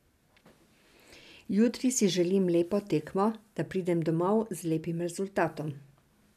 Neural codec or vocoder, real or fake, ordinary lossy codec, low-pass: none; real; none; 14.4 kHz